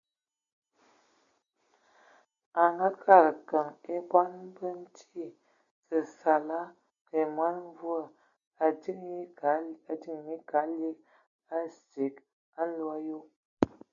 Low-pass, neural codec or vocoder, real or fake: 7.2 kHz; none; real